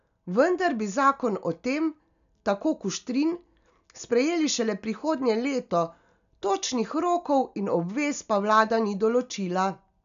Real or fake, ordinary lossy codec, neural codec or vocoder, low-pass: real; none; none; 7.2 kHz